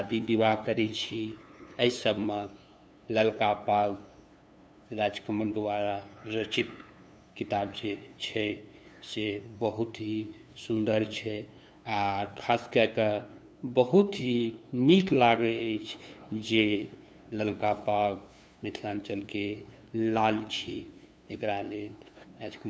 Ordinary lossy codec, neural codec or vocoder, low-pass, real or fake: none; codec, 16 kHz, 2 kbps, FunCodec, trained on LibriTTS, 25 frames a second; none; fake